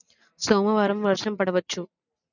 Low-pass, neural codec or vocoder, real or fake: 7.2 kHz; none; real